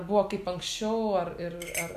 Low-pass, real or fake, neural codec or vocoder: 14.4 kHz; real; none